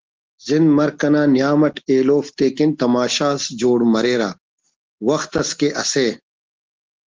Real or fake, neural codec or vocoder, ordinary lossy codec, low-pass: real; none; Opus, 16 kbps; 7.2 kHz